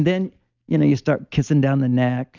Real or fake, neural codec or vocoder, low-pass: real; none; 7.2 kHz